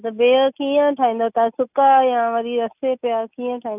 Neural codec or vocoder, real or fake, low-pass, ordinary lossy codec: none; real; 3.6 kHz; none